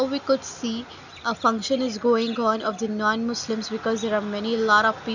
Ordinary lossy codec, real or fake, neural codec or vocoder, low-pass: none; real; none; 7.2 kHz